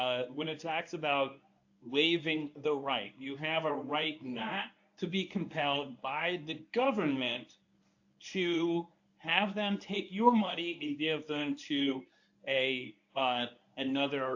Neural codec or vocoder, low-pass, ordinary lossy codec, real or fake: codec, 24 kHz, 0.9 kbps, WavTokenizer, medium speech release version 1; 7.2 kHz; AAC, 48 kbps; fake